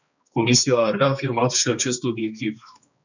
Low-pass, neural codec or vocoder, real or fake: 7.2 kHz; codec, 16 kHz, 2 kbps, X-Codec, HuBERT features, trained on balanced general audio; fake